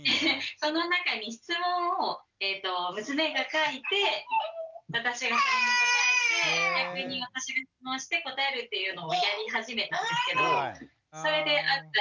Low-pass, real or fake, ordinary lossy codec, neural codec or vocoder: 7.2 kHz; real; none; none